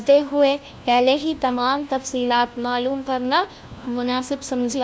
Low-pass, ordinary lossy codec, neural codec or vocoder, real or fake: none; none; codec, 16 kHz, 1 kbps, FunCodec, trained on LibriTTS, 50 frames a second; fake